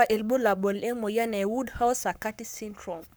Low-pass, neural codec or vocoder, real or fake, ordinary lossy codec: none; codec, 44.1 kHz, 7.8 kbps, Pupu-Codec; fake; none